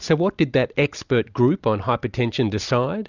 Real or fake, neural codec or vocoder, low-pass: real; none; 7.2 kHz